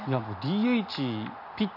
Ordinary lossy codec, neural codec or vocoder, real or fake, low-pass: none; none; real; 5.4 kHz